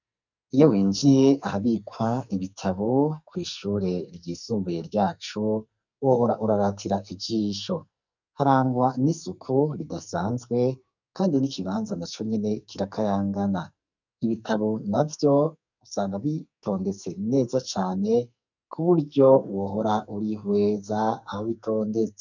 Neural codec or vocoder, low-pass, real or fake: codec, 44.1 kHz, 2.6 kbps, SNAC; 7.2 kHz; fake